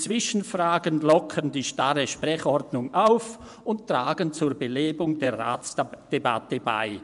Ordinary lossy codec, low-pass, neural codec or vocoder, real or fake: none; 10.8 kHz; vocoder, 24 kHz, 100 mel bands, Vocos; fake